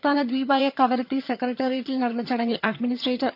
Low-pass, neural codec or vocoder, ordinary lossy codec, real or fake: 5.4 kHz; vocoder, 22.05 kHz, 80 mel bands, HiFi-GAN; none; fake